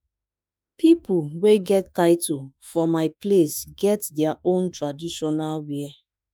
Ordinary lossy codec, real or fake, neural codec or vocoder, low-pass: none; fake; autoencoder, 48 kHz, 32 numbers a frame, DAC-VAE, trained on Japanese speech; none